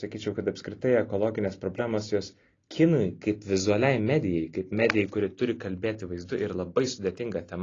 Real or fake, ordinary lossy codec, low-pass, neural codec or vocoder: real; AAC, 32 kbps; 7.2 kHz; none